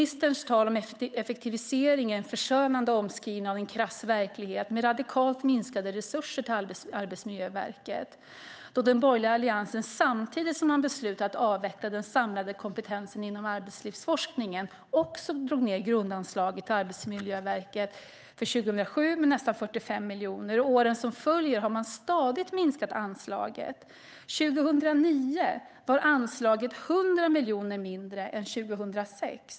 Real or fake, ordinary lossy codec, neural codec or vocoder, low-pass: fake; none; codec, 16 kHz, 8 kbps, FunCodec, trained on Chinese and English, 25 frames a second; none